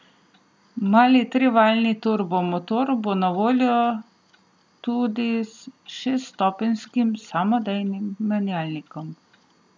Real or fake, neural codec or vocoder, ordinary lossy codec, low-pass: real; none; none; none